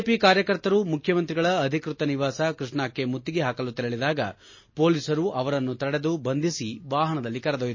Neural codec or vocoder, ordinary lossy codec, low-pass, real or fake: none; MP3, 32 kbps; 7.2 kHz; real